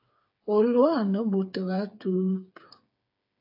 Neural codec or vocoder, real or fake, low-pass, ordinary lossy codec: codec, 16 kHz, 8 kbps, FreqCodec, smaller model; fake; 5.4 kHz; AAC, 48 kbps